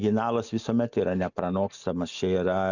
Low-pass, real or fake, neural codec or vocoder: 7.2 kHz; real; none